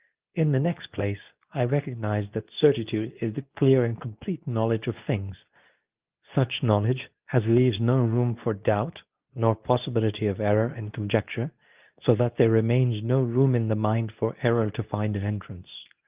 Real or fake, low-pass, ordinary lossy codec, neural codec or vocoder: fake; 3.6 kHz; Opus, 32 kbps; codec, 24 kHz, 0.9 kbps, WavTokenizer, medium speech release version 2